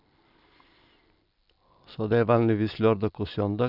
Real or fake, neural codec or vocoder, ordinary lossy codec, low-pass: real; none; none; 5.4 kHz